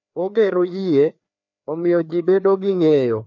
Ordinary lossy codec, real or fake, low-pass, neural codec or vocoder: none; fake; 7.2 kHz; codec, 16 kHz, 2 kbps, FreqCodec, larger model